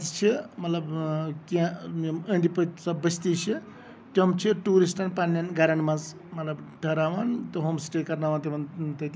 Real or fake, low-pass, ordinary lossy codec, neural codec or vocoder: real; none; none; none